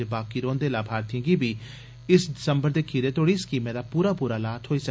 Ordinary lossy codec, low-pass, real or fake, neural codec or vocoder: none; none; real; none